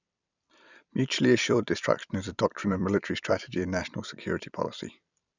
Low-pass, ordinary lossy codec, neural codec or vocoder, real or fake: 7.2 kHz; none; vocoder, 44.1 kHz, 128 mel bands every 256 samples, BigVGAN v2; fake